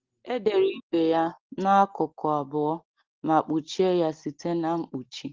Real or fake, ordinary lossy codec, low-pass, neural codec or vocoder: real; Opus, 16 kbps; 7.2 kHz; none